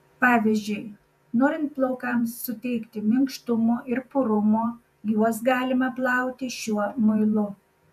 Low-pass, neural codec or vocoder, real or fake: 14.4 kHz; vocoder, 48 kHz, 128 mel bands, Vocos; fake